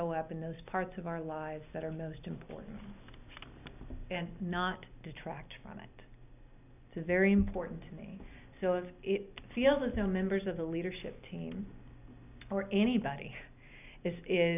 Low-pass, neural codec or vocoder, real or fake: 3.6 kHz; none; real